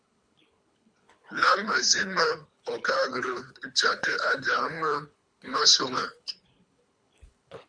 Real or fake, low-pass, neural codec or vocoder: fake; 9.9 kHz; codec, 24 kHz, 3 kbps, HILCodec